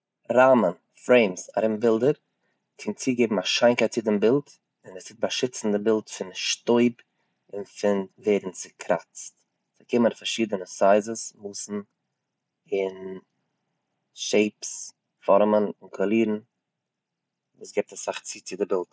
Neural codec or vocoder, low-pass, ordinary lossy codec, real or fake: none; none; none; real